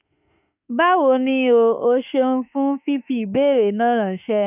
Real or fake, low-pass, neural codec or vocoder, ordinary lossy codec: fake; 3.6 kHz; autoencoder, 48 kHz, 32 numbers a frame, DAC-VAE, trained on Japanese speech; none